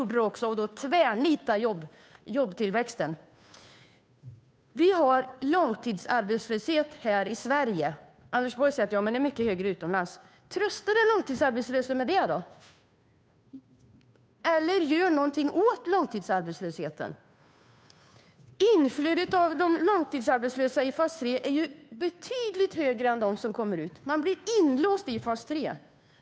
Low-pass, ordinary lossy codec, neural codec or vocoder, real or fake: none; none; codec, 16 kHz, 2 kbps, FunCodec, trained on Chinese and English, 25 frames a second; fake